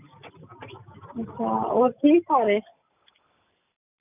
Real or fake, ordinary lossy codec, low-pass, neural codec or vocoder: real; none; 3.6 kHz; none